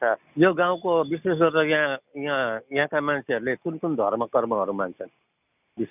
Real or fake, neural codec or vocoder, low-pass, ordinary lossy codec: real; none; 3.6 kHz; none